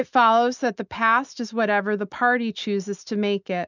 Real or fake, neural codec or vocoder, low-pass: real; none; 7.2 kHz